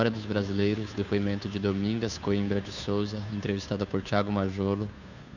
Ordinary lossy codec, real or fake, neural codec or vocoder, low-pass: none; fake; codec, 16 kHz, 2 kbps, FunCodec, trained on Chinese and English, 25 frames a second; 7.2 kHz